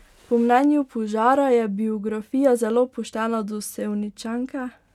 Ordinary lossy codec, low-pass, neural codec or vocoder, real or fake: none; 19.8 kHz; none; real